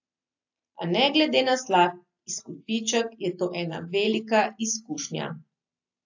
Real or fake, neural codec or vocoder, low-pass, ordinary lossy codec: real; none; 7.2 kHz; AAC, 48 kbps